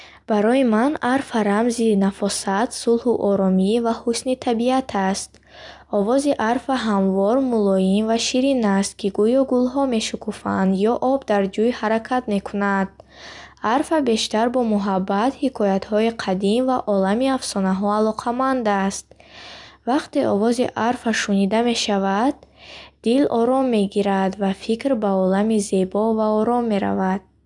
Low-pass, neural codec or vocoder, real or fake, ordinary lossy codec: 10.8 kHz; none; real; none